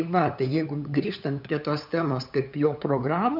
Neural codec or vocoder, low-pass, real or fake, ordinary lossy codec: codec, 16 kHz, 8 kbps, FunCodec, trained on LibriTTS, 25 frames a second; 5.4 kHz; fake; MP3, 48 kbps